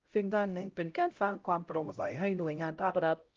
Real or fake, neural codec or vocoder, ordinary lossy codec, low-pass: fake; codec, 16 kHz, 0.5 kbps, X-Codec, HuBERT features, trained on LibriSpeech; Opus, 24 kbps; 7.2 kHz